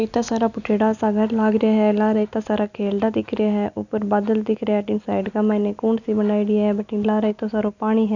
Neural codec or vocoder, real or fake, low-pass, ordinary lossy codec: none; real; 7.2 kHz; none